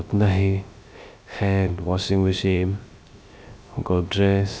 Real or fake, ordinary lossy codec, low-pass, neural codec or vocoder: fake; none; none; codec, 16 kHz, 0.3 kbps, FocalCodec